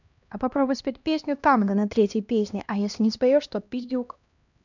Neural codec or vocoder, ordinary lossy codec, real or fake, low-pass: codec, 16 kHz, 1 kbps, X-Codec, HuBERT features, trained on LibriSpeech; none; fake; 7.2 kHz